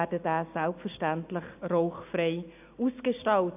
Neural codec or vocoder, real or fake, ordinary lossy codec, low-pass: none; real; AAC, 32 kbps; 3.6 kHz